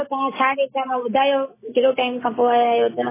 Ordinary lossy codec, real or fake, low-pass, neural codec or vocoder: MP3, 16 kbps; real; 3.6 kHz; none